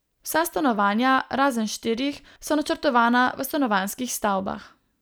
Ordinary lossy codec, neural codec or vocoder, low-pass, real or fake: none; none; none; real